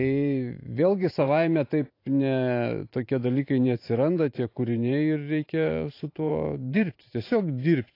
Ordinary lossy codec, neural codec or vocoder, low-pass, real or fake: AAC, 32 kbps; vocoder, 44.1 kHz, 128 mel bands every 512 samples, BigVGAN v2; 5.4 kHz; fake